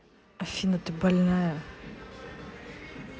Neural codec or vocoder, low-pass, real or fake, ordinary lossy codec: none; none; real; none